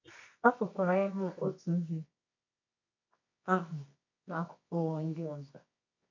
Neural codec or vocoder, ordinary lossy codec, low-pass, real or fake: codec, 24 kHz, 0.9 kbps, WavTokenizer, medium music audio release; MP3, 48 kbps; 7.2 kHz; fake